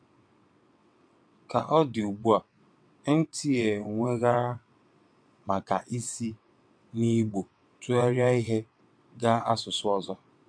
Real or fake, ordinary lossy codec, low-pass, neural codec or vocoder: fake; MP3, 64 kbps; 9.9 kHz; vocoder, 22.05 kHz, 80 mel bands, WaveNeXt